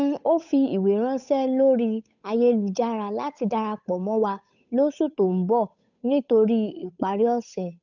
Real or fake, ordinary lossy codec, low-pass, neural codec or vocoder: fake; none; 7.2 kHz; codec, 16 kHz, 8 kbps, FunCodec, trained on Chinese and English, 25 frames a second